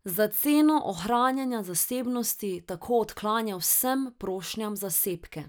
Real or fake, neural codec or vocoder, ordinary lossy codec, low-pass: real; none; none; none